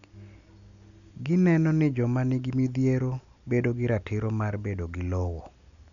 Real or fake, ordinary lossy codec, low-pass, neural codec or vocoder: real; none; 7.2 kHz; none